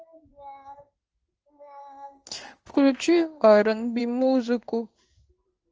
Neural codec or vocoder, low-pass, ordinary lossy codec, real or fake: codec, 16 kHz in and 24 kHz out, 1 kbps, XY-Tokenizer; 7.2 kHz; Opus, 24 kbps; fake